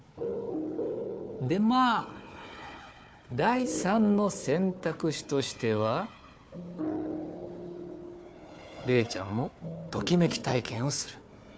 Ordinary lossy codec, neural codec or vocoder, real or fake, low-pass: none; codec, 16 kHz, 4 kbps, FunCodec, trained on Chinese and English, 50 frames a second; fake; none